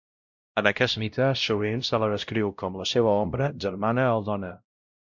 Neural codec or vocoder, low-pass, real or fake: codec, 16 kHz, 0.5 kbps, X-Codec, WavLM features, trained on Multilingual LibriSpeech; 7.2 kHz; fake